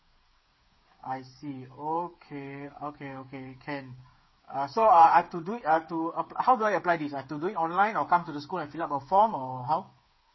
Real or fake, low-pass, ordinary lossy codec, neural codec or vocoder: fake; 7.2 kHz; MP3, 24 kbps; codec, 16 kHz, 8 kbps, FreqCodec, smaller model